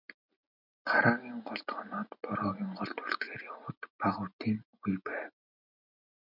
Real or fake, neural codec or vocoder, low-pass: real; none; 5.4 kHz